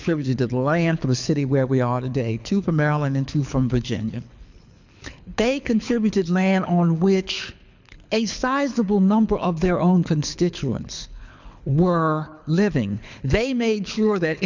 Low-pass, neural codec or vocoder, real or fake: 7.2 kHz; codec, 24 kHz, 6 kbps, HILCodec; fake